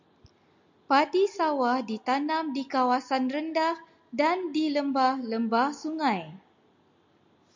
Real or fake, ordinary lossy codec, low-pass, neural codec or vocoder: real; MP3, 64 kbps; 7.2 kHz; none